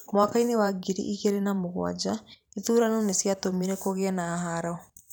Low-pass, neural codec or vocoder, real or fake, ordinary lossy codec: none; vocoder, 44.1 kHz, 128 mel bands every 512 samples, BigVGAN v2; fake; none